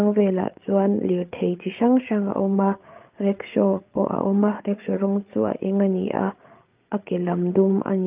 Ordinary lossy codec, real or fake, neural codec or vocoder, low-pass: Opus, 24 kbps; fake; codec, 16 kHz, 8 kbps, FreqCodec, larger model; 3.6 kHz